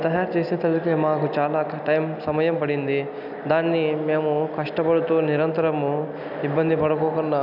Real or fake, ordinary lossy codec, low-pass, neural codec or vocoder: real; none; 5.4 kHz; none